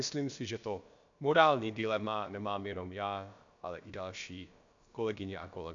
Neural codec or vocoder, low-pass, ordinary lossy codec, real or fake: codec, 16 kHz, about 1 kbps, DyCAST, with the encoder's durations; 7.2 kHz; AAC, 64 kbps; fake